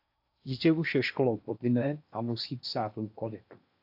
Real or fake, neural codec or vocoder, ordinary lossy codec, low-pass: fake; codec, 16 kHz in and 24 kHz out, 0.8 kbps, FocalCodec, streaming, 65536 codes; AAC, 48 kbps; 5.4 kHz